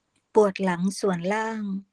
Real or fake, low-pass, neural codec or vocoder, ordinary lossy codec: real; 9.9 kHz; none; Opus, 16 kbps